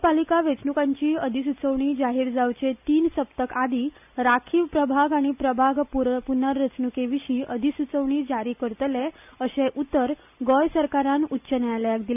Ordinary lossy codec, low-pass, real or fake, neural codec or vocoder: none; 3.6 kHz; real; none